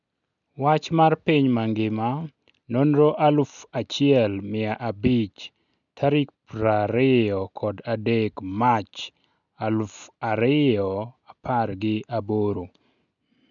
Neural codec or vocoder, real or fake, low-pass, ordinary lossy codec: none; real; 7.2 kHz; none